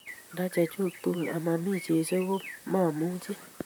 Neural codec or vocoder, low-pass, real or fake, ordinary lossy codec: vocoder, 44.1 kHz, 128 mel bands, Pupu-Vocoder; none; fake; none